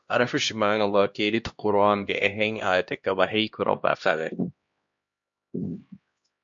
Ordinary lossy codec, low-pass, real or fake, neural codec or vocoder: MP3, 48 kbps; 7.2 kHz; fake; codec, 16 kHz, 1 kbps, X-Codec, HuBERT features, trained on LibriSpeech